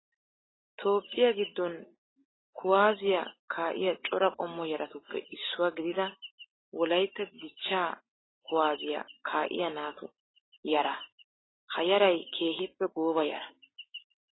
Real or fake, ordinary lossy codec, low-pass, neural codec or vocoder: real; AAC, 16 kbps; 7.2 kHz; none